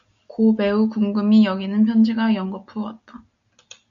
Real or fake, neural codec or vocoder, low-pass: real; none; 7.2 kHz